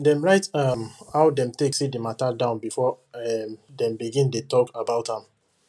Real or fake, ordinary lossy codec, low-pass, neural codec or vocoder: real; none; none; none